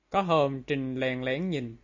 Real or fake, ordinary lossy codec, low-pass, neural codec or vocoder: real; AAC, 48 kbps; 7.2 kHz; none